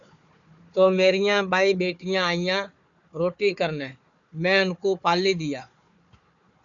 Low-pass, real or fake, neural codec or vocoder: 7.2 kHz; fake; codec, 16 kHz, 4 kbps, FunCodec, trained on Chinese and English, 50 frames a second